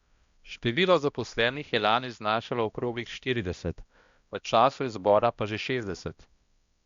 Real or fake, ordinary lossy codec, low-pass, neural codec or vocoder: fake; Opus, 64 kbps; 7.2 kHz; codec, 16 kHz, 1 kbps, X-Codec, HuBERT features, trained on balanced general audio